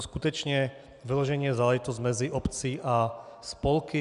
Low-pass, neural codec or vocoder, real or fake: 10.8 kHz; none; real